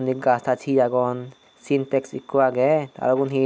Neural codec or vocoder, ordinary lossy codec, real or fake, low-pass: none; none; real; none